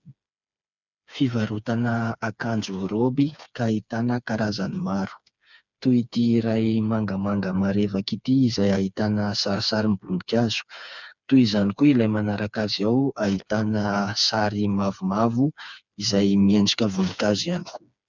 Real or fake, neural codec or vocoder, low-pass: fake; codec, 16 kHz, 4 kbps, FreqCodec, smaller model; 7.2 kHz